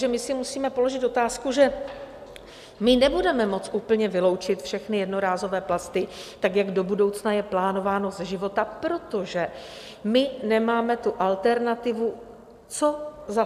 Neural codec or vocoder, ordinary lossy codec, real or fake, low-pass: none; AAC, 96 kbps; real; 14.4 kHz